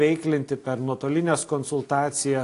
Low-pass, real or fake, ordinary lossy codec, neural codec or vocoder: 10.8 kHz; real; AAC, 48 kbps; none